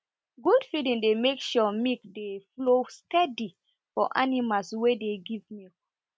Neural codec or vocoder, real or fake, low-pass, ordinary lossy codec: none; real; none; none